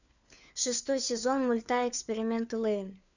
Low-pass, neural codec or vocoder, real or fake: 7.2 kHz; codec, 16 kHz, 4 kbps, FunCodec, trained on LibriTTS, 50 frames a second; fake